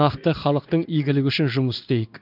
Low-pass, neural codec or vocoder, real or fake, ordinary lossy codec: 5.4 kHz; codec, 16 kHz in and 24 kHz out, 1 kbps, XY-Tokenizer; fake; none